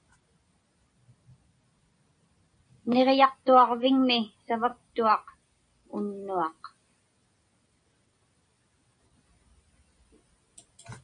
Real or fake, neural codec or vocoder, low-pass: real; none; 9.9 kHz